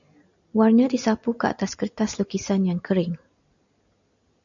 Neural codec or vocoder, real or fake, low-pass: none; real; 7.2 kHz